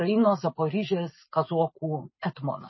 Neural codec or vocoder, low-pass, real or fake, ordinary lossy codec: none; 7.2 kHz; real; MP3, 24 kbps